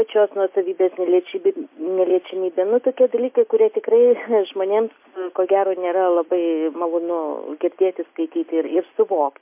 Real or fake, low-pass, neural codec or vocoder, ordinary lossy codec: real; 3.6 kHz; none; MP3, 24 kbps